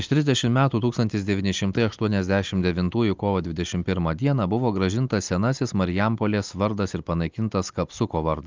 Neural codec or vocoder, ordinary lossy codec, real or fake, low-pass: none; Opus, 24 kbps; real; 7.2 kHz